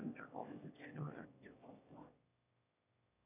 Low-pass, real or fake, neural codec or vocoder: 3.6 kHz; fake; autoencoder, 22.05 kHz, a latent of 192 numbers a frame, VITS, trained on one speaker